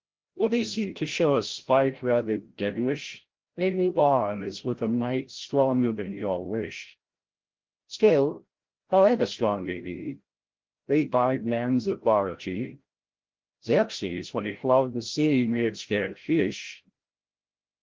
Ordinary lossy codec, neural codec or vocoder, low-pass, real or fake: Opus, 16 kbps; codec, 16 kHz, 0.5 kbps, FreqCodec, larger model; 7.2 kHz; fake